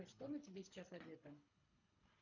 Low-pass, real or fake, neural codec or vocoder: 7.2 kHz; fake; codec, 24 kHz, 3 kbps, HILCodec